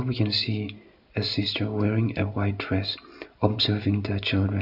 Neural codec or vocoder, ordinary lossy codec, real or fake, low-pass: none; none; real; 5.4 kHz